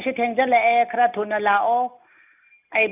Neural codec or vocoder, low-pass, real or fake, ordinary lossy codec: none; 3.6 kHz; real; none